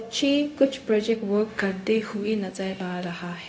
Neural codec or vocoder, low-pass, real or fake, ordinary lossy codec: codec, 16 kHz, 0.4 kbps, LongCat-Audio-Codec; none; fake; none